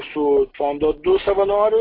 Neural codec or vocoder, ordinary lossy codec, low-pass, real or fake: none; AAC, 24 kbps; 5.4 kHz; real